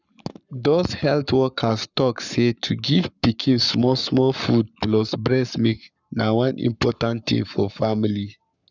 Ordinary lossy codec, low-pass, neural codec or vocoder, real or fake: none; 7.2 kHz; codec, 44.1 kHz, 7.8 kbps, Pupu-Codec; fake